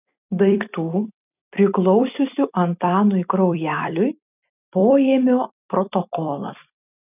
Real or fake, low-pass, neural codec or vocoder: fake; 3.6 kHz; vocoder, 44.1 kHz, 128 mel bands every 256 samples, BigVGAN v2